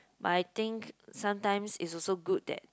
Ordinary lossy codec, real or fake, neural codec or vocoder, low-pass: none; real; none; none